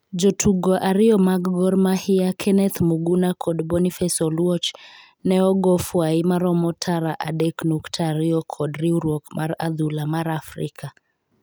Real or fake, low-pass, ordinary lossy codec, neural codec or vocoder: real; none; none; none